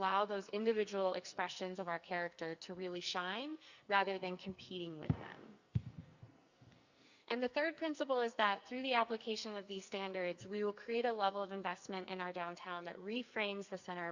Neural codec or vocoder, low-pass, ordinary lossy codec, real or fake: codec, 44.1 kHz, 2.6 kbps, SNAC; 7.2 kHz; Opus, 64 kbps; fake